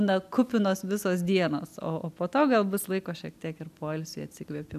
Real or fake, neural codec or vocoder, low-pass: real; none; 14.4 kHz